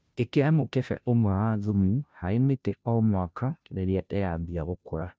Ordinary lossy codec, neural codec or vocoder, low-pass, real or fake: none; codec, 16 kHz, 0.5 kbps, FunCodec, trained on Chinese and English, 25 frames a second; none; fake